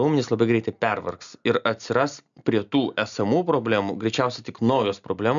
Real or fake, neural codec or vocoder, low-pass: real; none; 7.2 kHz